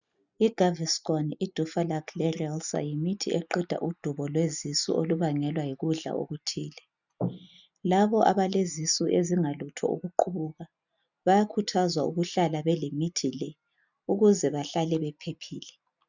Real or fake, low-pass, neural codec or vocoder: real; 7.2 kHz; none